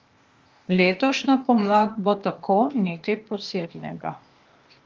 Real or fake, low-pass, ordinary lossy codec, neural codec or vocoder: fake; 7.2 kHz; Opus, 32 kbps; codec, 16 kHz, 0.8 kbps, ZipCodec